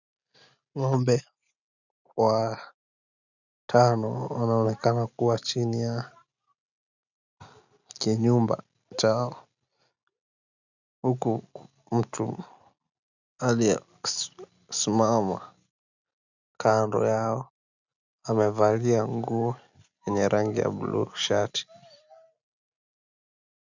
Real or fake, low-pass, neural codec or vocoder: real; 7.2 kHz; none